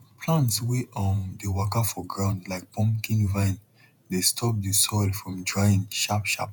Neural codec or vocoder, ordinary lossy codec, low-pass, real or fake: vocoder, 48 kHz, 128 mel bands, Vocos; none; 19.8 kHz; fake